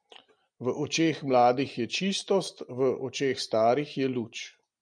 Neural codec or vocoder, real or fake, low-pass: none; real; 9.9 kHz